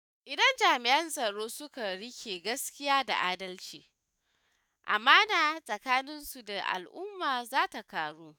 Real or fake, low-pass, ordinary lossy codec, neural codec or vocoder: fake; none; none; autoencoder, 48 kHz, 128 numbers a frame, DAC-VAE, trained on Japanese speech